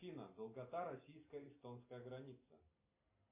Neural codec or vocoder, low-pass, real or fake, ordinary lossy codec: none; 3.6 kHz; real; MP3, 24 kbps